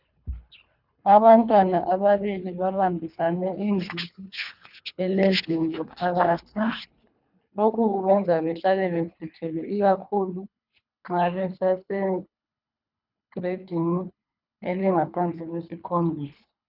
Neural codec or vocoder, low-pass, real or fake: codec, 24 kHz, 3 kbps, HILCodec; 5.4 kHz; fake